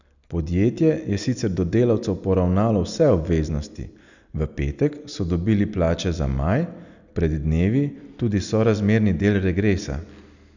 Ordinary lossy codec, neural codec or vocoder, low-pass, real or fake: none; none; 7.2 kHz; real